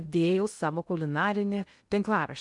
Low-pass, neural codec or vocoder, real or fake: 10.8 kHz; codec, 16 kHz in and 24 kHz out, 0.6 kbps, FocalCodec, streaming, 2048 codes; fake